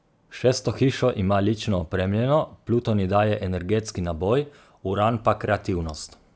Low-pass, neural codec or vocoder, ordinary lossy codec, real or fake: none; none; none; real